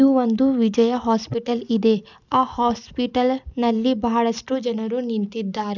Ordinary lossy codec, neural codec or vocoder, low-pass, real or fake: none; none; 7.2 kHz; real